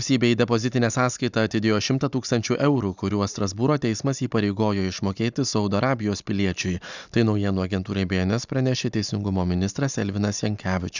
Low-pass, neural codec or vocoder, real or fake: 7.2 kHz; none; real